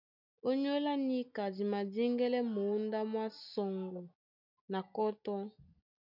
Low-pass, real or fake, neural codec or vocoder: 5.4 kHz; real; none